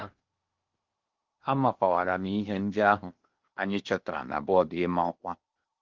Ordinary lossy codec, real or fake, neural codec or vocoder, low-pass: Opus, 32 kbps; fake; codec, 16 kHz in and 24 kHz out, 0.8 kbps, FocalCodec, streaming, 65536 codes; 7.2 kHz